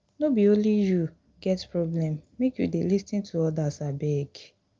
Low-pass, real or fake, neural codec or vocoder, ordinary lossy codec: 7.2 kHz; real; none; Opus, 24 kbps